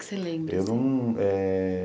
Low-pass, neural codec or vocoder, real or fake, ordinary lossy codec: none; none; real; none